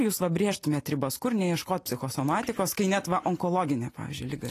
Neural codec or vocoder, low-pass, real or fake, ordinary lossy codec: none; 14.4 kHz; real; AAC, 48 kbps